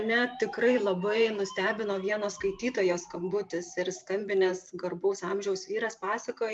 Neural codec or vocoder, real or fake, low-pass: vocoder, 44.1 kHz, 128 mel bands every 512 samples, BigVGAN v2; fake; 10.8 kHz